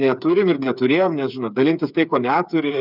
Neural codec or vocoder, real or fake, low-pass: codec, 16 kHz, 8 kbps, FreqCodec, smaller model; fake; 5.4 kHz